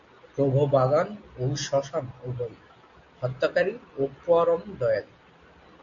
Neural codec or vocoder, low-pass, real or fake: none; 7.2 kHz; real